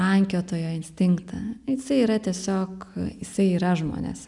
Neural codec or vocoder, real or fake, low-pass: none; real; 10.8 kHz